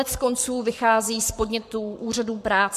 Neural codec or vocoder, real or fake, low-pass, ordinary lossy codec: codec, 44.1 kHz, 7.8 kbps, Pupu-Codec; fake; 14.4 kHz; MP3, 96 kbps